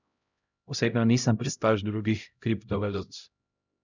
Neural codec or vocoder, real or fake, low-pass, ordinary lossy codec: codec, 16 kHz, 0.5 kbps, X-Codec, HuBERT features, trained on LibriSpeech; fake; 7.2 kHz; none